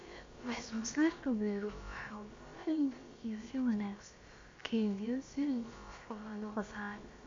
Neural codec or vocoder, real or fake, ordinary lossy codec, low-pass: codec, 16 kHz, about 1 kbps, DyCAST, with the encoder's durations; fake; MP3, 48 kbps; 7.2 kHz